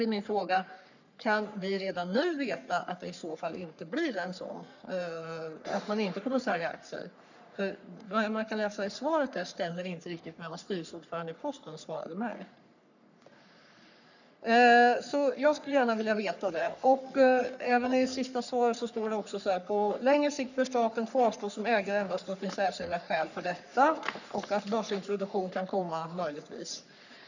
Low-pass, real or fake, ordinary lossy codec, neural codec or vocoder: 7.2 kHz; fake; none; codec, 44.1 kHz, 3.4 kbps, Pupu-Codec